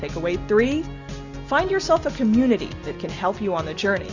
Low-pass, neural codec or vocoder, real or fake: 7.2 kHz; none; real